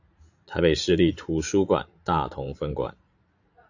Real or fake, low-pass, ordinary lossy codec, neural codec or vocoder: fake; 7.2 kHz; AAC, 48 kbps; vocoder, 44.1 kHz, 80 mel bands, Vocos